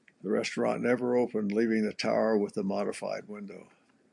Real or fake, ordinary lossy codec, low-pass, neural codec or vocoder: fake; MP3, 64 kbps; 10.8 kHz; vocoder, 44.1 kHz, 128 mel bands every 256 samples, BigVGAN v2